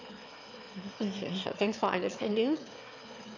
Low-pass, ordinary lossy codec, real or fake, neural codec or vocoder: 7.2 kHz; AAC, 48 kbps; fake; autoencoder, 22.05 kHz, a latent of 192 numbers a frame, VITS, trained on one speaker